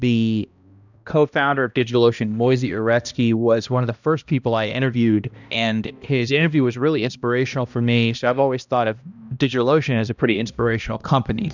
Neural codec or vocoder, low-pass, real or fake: codec, 16 kHz, 1 kbps, X-Codec, HuBERT features, trained on balanced general audio; 7.2 kHz; fake